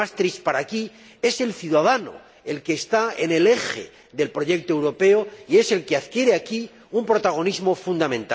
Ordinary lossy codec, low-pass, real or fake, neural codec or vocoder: none; none; real; none